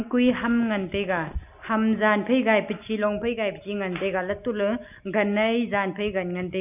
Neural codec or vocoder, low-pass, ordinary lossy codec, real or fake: none; 3.6 kHz; none; real